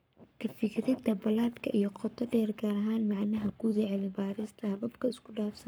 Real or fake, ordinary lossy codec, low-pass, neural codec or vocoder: fake; none; none; codec, 44.1 kHz, 7.8 kbps, Pupu-Codec